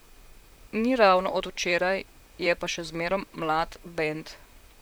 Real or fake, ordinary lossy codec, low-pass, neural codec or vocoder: fake; none; none; vocoder, 44.1 kHz, 128 mel bands, Pupu-Vocoder